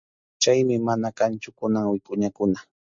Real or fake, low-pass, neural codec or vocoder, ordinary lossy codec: real; 7.2 kHz; none; MP3, 48 kbps